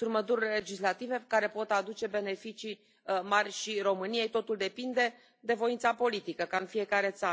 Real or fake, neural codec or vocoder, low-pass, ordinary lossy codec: real; none; none; none